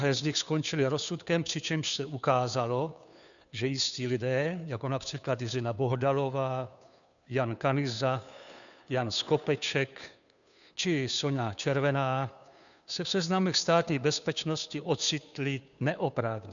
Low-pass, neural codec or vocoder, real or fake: 7.2 kHz; codec, 16 kHz, 2 kbps, FunCodec, trained on Chinese and English, 25 frames a second; fake